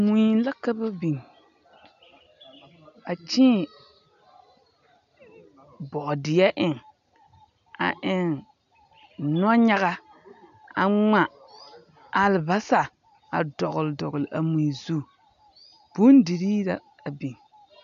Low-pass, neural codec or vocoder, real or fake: 7.2 kHz; none; real